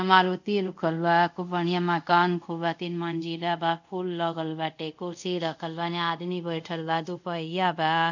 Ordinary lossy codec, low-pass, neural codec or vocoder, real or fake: none; 7.2 kHz; codec, 24 kHz, 0.5 kbps, DualCodec; fake